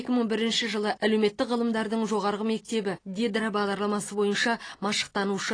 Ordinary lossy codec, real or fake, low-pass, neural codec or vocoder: AAC, 32 kbps; real; 9.9 kHz; none